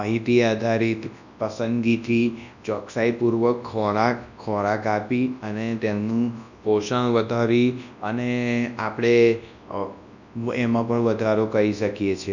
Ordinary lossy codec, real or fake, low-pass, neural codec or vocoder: none; fake; 7.2 kHz; codec, 24 kHz, 0.9 kbps, WavTokenizer, large speech release